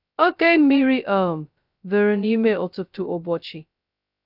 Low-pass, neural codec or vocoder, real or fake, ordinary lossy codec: 5.4 kHz; codec, 16 kHz, 0.2 kbps, FocalCodec; fake; none